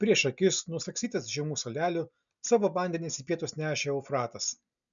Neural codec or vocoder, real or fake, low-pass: none; real; 7.2 kHz